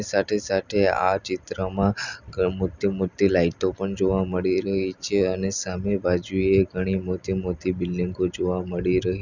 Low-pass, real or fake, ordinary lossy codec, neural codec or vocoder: 7.2 kHz; real; none; none